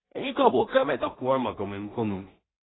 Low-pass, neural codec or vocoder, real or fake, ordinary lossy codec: 7.2 kHz; codec, 16 kHz in and 24 kHz out, 0.4 kbps, LongCat-Audio-Codec, two codebook decoder; fake; AAC, 16 kbps